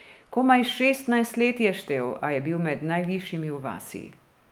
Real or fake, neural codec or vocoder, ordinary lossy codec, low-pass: fake; autoencoder, 48 kHz, 128 numbers a frame, DAC-VAE, trained on Japanese speech; Opus, 32 kbps; 19.8 kHz